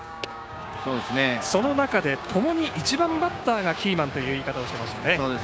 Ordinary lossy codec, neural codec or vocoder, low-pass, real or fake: none; codec, 16 kHz, 6 kbps, DAC; none; fake